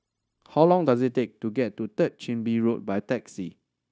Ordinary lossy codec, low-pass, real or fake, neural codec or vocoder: none; none; fake; codec, 16 kHz, 0.9 kbps, LongCat-Audio-Codec